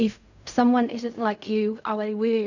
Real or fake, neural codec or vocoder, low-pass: fake; codec, 16 kHz in and 24 kHz out, 0.4 kbps, LongCat-Audio-Codec, fine tuned four codebook decoder; 7.2 kHz